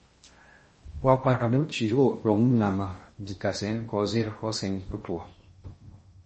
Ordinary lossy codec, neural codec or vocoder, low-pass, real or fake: MP3, 32 kbps; codec, 16 kHz in and 24 kHz out, 0.6 kbps, FocalCodec, streaming, 2048 codes; 10.8 kHz; fake